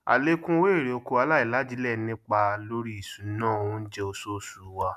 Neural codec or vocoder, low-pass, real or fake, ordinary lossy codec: none; 14.4 kHz; real; none